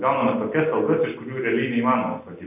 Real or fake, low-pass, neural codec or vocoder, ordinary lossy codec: real; 3.6 kHz; none; AAC, 32 kbps